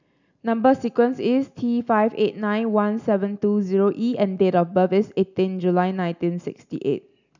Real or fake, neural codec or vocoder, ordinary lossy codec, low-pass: real; none; none; 7.2 kHz